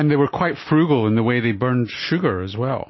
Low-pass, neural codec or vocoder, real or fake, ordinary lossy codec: 7.2 kHz; none; real; MP3, 24 kbps